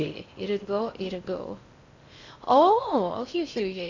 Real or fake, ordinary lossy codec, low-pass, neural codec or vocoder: fake; MP3, 64 kbps; 7.2 kHz; codec, 16 kHz in and 24 kHz out, 0.6 kbps, FocalCodec, streaming, 4096 codes